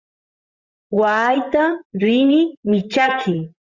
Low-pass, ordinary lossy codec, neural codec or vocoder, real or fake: 7.2 kHz; Opus, 64 kbps; vocoder, 44.1 kHz, 128 mel bands, Pupu-Vocoder; fake